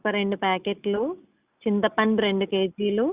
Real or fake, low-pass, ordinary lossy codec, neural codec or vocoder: real; 3.6 kHz; Opus, 64 kbps; none